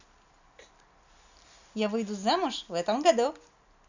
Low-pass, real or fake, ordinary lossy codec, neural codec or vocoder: 7.2 kHz; real; none; none